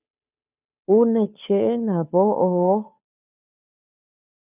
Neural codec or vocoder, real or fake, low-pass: codec, 16 kHz, 2 kbps, FunCodec, trained on Chinese and English, 25 frames a second; fake; 3.6 kHz